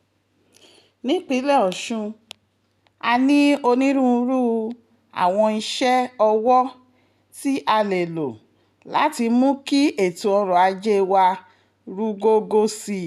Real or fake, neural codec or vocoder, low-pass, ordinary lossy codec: real; none; 14.4 kHz; none